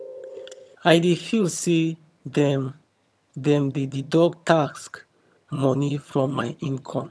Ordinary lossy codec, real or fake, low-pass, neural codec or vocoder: none; fake; none; vocoder, 22.05 kHz, 80 mel bands, HiFi-GAN